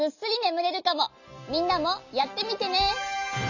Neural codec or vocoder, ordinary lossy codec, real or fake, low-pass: none; none; real; 7.2 kHz